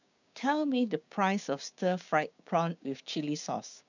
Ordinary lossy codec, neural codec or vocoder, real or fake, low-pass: none; codec, 16 kHz, 6 kbps, DAC; fake; 7.2 kHz